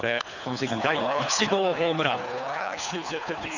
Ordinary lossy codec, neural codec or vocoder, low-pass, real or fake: none; codec, 24 kHz, 3 kbps, HILCodec; 7.2 kHz; fake